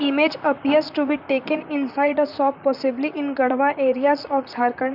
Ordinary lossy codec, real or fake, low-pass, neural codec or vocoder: none; fake; 5.4 kHz; vocoder, 44.1 kHz, 128 mel bands, Pupu-Vocoder